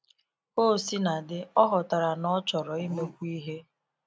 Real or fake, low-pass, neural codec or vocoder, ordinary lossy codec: real; none; none; none